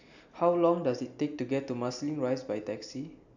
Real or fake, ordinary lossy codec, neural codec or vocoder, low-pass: real; none; none; 7.2 kHz